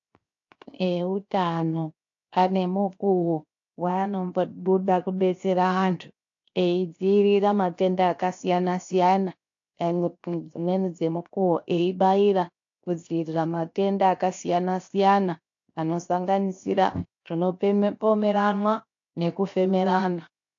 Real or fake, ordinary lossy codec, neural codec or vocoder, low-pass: fake; AAC, 48 kbps; codec, 16 kHz, 0.7 kbps, FocalCodec; 7.2 kHz